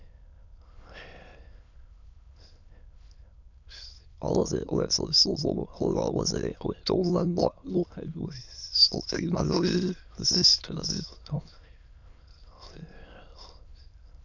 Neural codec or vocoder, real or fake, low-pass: autoencoder, 22.05 kHz, a latent of 192 numbers a frame, VITS, trained on many speakers; fake; 7.2 kHz